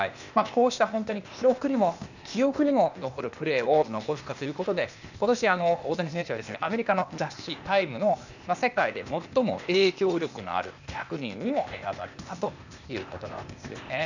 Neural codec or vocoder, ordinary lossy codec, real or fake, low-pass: codec, 16 kHz, 0.8 kbps, ZipCodec; none; fake; 7.2 kHz